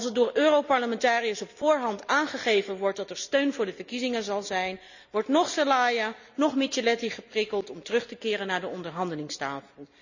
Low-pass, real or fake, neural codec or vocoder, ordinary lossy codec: 7.2 kHz; real; none; none